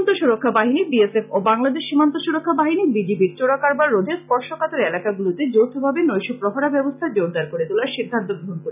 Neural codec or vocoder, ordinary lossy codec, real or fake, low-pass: none; none; real; 3.6 kHz